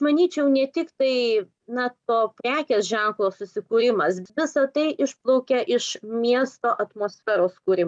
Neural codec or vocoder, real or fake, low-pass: none; real; 9.9 kHz